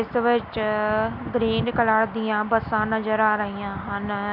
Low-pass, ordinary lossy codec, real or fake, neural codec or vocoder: 5.4 kHz; Opus, 64 kbps; real; none